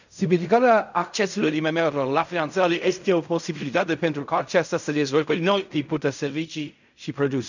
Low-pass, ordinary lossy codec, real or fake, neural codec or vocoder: 7.2 kHz; none; fake; codec, 16 kHz in and 24 kHz out, 0.4 kbps, LongCat-Audio-Codec, fine tuned four codebook decoder